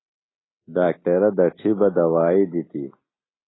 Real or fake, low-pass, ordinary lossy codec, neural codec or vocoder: real; 7.2 kHz; AAC, 16 kbps; none